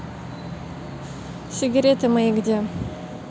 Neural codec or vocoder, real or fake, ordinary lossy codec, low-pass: none; real; none; none